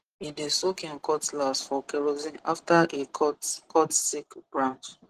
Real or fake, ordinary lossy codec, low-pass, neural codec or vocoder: real; Opus, 16 kbps; 14.4 kHz; none